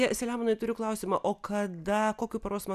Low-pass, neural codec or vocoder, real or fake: 14.4 kHz; none; real